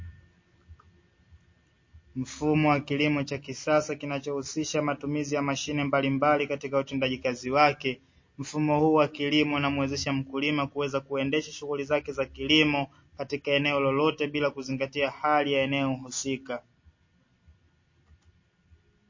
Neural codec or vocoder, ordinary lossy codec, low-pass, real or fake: none; MP3, 32 kbps; 7.2 kHz; real